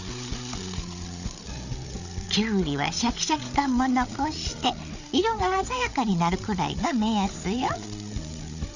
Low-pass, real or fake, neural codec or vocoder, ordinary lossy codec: 7.2 kHz; fake; codec, 16 kHz, 8 kbps, FreqCodec, larger model; none